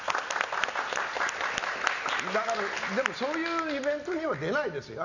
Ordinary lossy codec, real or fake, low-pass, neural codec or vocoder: none; real; 7.2 kHz; none